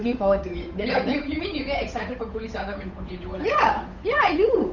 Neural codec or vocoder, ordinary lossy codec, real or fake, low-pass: codec, 16 kHz, 8 kbps, FunCodec, trained on Chinese and English, 25 frames a second; none; fake; 7.2 kHz